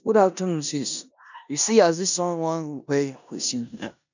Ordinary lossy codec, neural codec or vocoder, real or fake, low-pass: none; codec, 16 kHz in and 24 kHz out, 0.9 kbps, LongCat-Audio-Codec, four codebook decoder; fake; 7.2 kHz